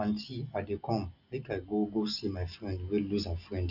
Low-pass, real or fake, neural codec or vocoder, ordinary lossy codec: 5.4 kHz; real; none; none